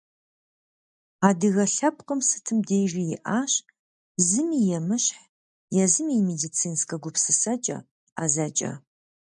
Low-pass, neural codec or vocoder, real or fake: 10.8 kHz; none; real